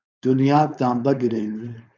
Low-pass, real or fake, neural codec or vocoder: 7.2 kHz; fake; codec, 16 kHz, 4.8 kbps, FACodec